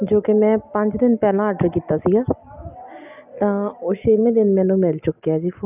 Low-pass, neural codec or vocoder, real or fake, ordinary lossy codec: 3.6 kHz; none; real; none